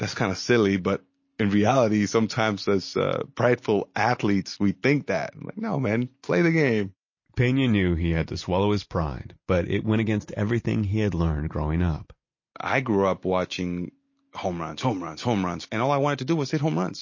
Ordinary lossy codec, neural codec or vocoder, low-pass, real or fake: MP3, 32 kbps; none; 7.2 kHz; real